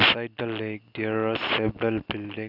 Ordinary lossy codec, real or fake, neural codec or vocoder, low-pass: none; real; none; 5.4 kHz